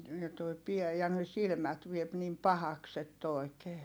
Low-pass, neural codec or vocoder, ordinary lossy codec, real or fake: none; none; none; real